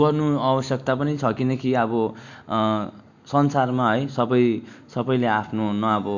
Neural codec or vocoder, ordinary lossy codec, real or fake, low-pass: none; none; real; 7.2 kHz